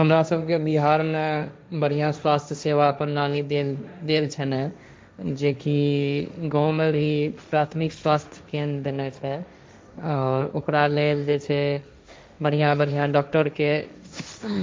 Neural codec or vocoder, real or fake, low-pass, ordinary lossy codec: codec, 16 kHz, 1.1 kbps, Voila-Tokenizer; fake; none; none